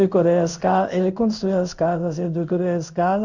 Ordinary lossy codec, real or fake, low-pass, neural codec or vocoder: none; fake; 7.2 kHz; codec, 16 kHz in and 24 kHz out, 1 kbps, XY-Tokenizer